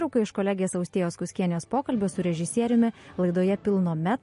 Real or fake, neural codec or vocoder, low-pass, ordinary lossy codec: real; none; 10.8 kHz; MP3, 48 kbps